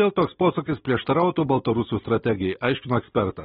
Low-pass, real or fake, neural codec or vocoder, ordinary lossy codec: 19.8 kHz; real; none; AAC, 16 kbps